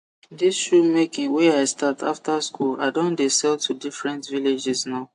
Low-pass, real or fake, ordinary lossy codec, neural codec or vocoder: 10.8 kHz; real; AAC, 64 kbps; none